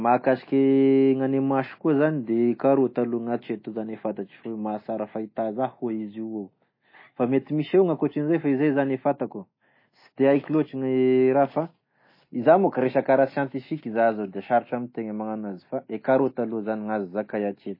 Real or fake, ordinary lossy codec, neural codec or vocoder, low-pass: real; MP3, 24 kbps; none; 5.4 kHz